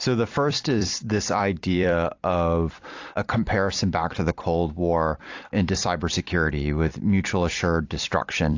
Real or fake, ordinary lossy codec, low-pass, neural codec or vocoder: fake; AAC, 48 kbps; 7.2 kHz; vocoder, 44.1 kHz, 128 mel bands every 256 samples, BigVGAN v2